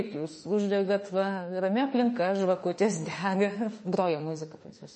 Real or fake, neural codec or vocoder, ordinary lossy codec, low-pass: fake; autoencoder, 48 kHz, 32 numbers a frame, DAC-VAE, trained on Japanese speech; MP3, 32 kbps; 10.8 kHz